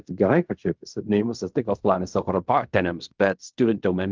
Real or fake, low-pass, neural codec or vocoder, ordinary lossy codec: fake; 7.2 kHz; codec, 16 kHz in and 24 kHz out, 0.4 kbps, LongCat-Audio-Codec, fine tuned four codebook decoder; Opus, 24 kbps